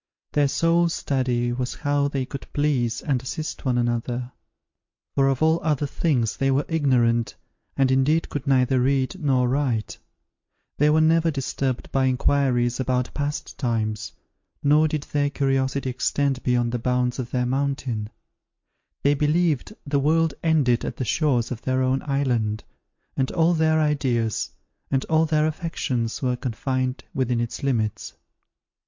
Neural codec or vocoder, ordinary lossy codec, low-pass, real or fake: none; MP3, 48 kbps; 7.2 kHz; real